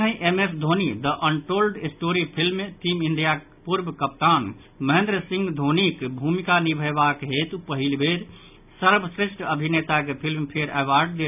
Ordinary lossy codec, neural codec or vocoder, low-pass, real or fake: none; none; 3.6 kHz; real